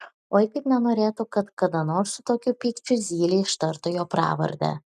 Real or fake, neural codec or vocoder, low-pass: real; none; 14.4 kHz